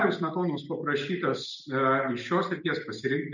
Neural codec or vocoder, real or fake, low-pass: none; real; 7.2 kHz